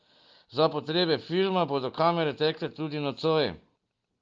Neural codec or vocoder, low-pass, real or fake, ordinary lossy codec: none; 7.2 kHz; real; Opus, 24 kbps